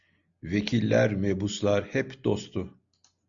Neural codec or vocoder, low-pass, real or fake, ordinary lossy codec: none; 7.2 kHz; real; MP3, 96 kbps